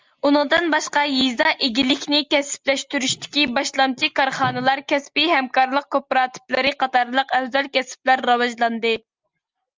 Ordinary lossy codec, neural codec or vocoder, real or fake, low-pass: Opus, 32 kbps; none; real; 7.2 kHz